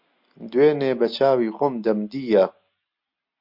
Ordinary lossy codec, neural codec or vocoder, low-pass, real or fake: MP3, 48 kbps; none; 5.4 kHz; real